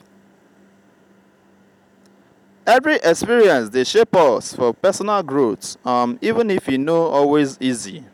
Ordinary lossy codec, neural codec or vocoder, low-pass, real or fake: none; none; 19.8 kHz; real